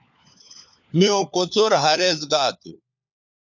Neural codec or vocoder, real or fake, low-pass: codec, 16 kHz, 4 kbps, FunCodec, trained on LibriTTS, 50 frames a second; fake; 7.2 kHz